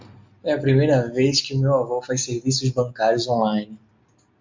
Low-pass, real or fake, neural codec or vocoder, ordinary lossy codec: 7.2 kHz; real; none; MP3, 64 kbps